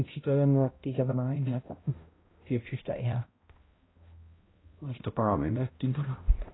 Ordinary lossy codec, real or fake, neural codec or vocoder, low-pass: AAC, 16 kbps; fake; codec, 16 kHz, 0.5 kbps, X-Codec, HuBERT features, trained on balanced general audio; 7.2 kHz